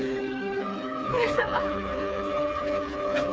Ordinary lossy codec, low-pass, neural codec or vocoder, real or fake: none; none; codec, 16 kHz, 4 kbps, FreqCodec, smaller model; fake